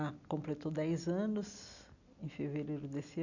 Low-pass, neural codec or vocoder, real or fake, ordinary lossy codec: 7.2 kHz; none; real; none